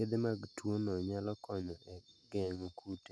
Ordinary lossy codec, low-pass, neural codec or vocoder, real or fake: none; none; none; real